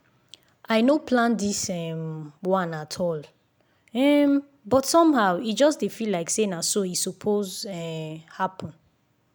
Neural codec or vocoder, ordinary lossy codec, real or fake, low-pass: none; none; real; none